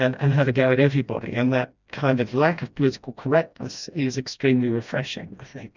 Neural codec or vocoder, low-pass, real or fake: codec, 16 kHz, 1 kbps, FreqCodec, smaller model; 7.2 kHz; fake